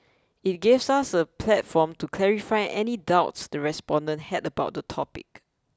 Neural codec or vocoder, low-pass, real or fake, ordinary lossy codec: none; none; real; none